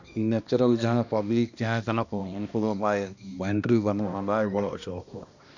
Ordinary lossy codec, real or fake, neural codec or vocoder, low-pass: none; fake; codec, 16 kHz, 1 kbps, X-Codec, HuBERT features, trained on balanced general audio; 7.2 kHz